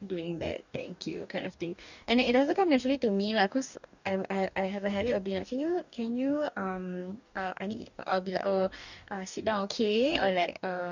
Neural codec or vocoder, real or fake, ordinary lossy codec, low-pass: codec, 44.1 kHz, 2.6 kbps, DAC; fake; none; 7.2 kHz